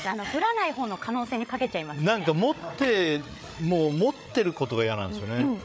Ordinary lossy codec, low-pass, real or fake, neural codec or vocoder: none; none; fake; codec, 16 kHz, 8 kbps, FreqCodec, larger model